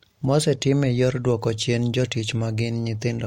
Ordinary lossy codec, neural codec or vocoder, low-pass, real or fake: MP3, 64 kbps; none; 19.8 kHz; real